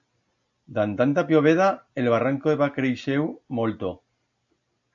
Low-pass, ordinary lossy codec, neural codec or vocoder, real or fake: 7.2 kHz; AAC, 64 kbps; none; real